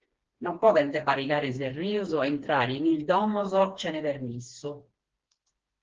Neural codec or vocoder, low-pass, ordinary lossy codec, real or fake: codec, 16 kHz, 2 kbps, FreqCodec, smaller model; 7.2 kHz; Opus, 16 kbps; fake